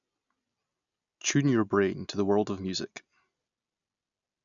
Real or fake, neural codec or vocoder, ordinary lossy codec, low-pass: real; none; none; 7.2 kHz